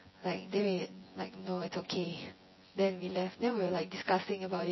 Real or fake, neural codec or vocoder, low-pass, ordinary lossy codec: fake; vocoder, 24 kHz, 100 mel bands, Vocos; 7.2 kHz; MP3, 24 kbps